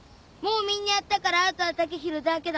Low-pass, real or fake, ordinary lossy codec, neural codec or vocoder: none; real; none; none